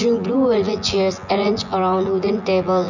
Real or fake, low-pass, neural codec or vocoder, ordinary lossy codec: fake; 7.2 kHz; vocoder, 24 kHz, 100 mel bands, Vocos; none